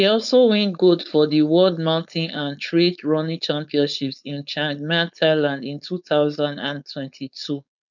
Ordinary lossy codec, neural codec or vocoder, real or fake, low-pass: none; codec, 16 kHz, 4.8 kbps, FACodec; fake; 7.2 kHz